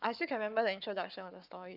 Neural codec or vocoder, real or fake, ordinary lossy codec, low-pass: codec, 44.1 kHz, 7.8 kbps, Pupu-Codec; fake; none; 5.4 kHz